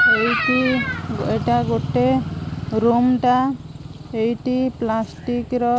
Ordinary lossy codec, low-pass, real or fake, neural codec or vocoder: none; none; real; none